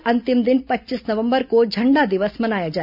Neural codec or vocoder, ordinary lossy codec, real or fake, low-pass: none; none; real; 5.4 kHz